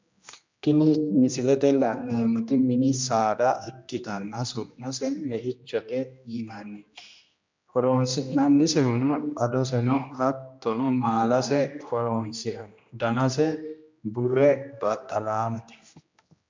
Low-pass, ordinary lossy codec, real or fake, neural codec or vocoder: 7.2 kHz; MP3, 64 kbps; fake; codec, 16 kHz, 1 kbps, X-Codec, HuBERT features, trained on general audio